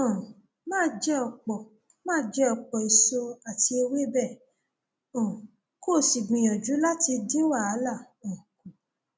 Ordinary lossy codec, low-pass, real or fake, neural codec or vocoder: none; none; real; none